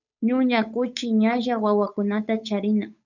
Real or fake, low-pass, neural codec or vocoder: fake; 7.2 kHz; codec, 16 kHz, 8 kbps, FunCodec, trained on Chinese and English, 25 frames a second